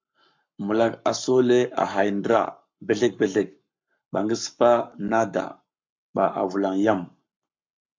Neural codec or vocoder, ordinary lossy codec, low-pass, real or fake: codec, 44.1 kHz, 7.8 kbps, Pupu-Codec; MP3, 64 kbps; 7.2 kHz; fake